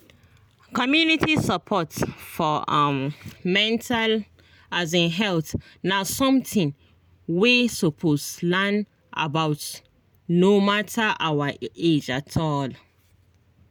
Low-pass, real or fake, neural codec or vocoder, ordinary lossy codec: none; real; none; none